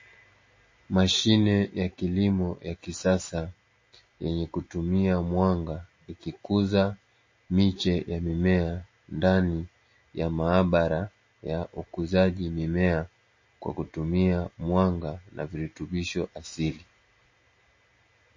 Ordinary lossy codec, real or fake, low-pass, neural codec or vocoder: MP3, 32 kbps; real; 7.2 kHz; none